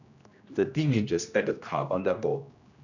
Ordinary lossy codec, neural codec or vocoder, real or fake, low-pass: none; codec, 16 kHz, 1 kbps, X-Codec, HuBERT features, trained on general audio; fake; 7.2 kHz